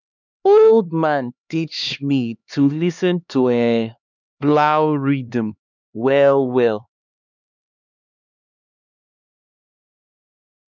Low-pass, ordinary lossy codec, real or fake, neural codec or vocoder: 7.2 kHz; none; fake; codec, 16 kHz, 2 kbps, X-Codec, HuBERT features, trained on LibriSpeech